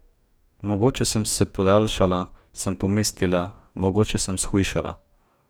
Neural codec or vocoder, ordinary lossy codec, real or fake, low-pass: codec, 44.1 kHz, 2.6 kbps, DAC; none; fake; none